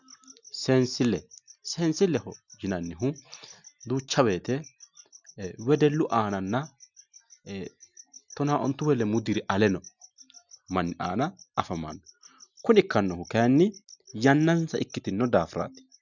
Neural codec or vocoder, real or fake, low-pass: none; real; 7.2 kHz